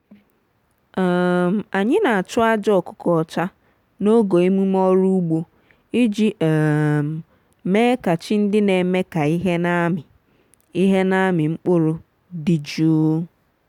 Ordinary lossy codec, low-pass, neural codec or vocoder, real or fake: none; 19.8 kHz; none; real